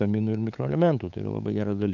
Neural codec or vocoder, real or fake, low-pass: codec, 16 kHz, 8 kbps, FunCodec, trained on LibriTTS, 25 frames a second; fake; 7.2 kHz